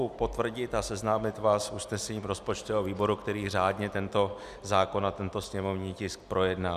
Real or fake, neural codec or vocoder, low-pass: real; none; 14.4 kHz